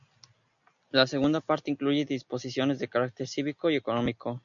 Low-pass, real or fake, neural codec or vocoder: 7.2 kHz; real; none